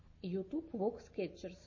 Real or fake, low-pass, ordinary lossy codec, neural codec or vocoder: real; 7.2 kHz; MP3, 32 kbps; none